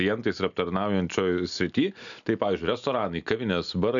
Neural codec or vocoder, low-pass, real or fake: none; 7.2 kHz; real